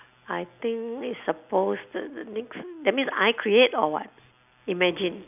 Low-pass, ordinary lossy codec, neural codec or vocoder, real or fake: 3.6 kHz; none; none; real